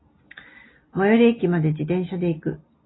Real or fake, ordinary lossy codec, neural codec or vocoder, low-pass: real; AAC, 16 kbps; none; 7.2 kHz